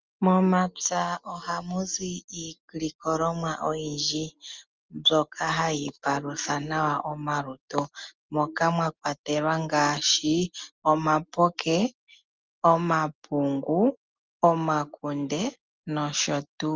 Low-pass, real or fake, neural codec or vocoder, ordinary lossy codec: 7.2 kHz; real; none; Opus, 32 kbps